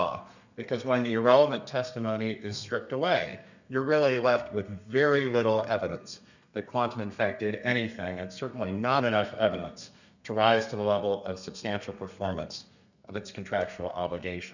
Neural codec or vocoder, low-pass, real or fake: codec, 32 kHz, 1.9 kbps, SNAC; 7.2 kHz; fake